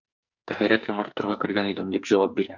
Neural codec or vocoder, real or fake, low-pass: codec, 44.1 kHz, 2.6 kbps, SNAC; fake; 7.2 kHz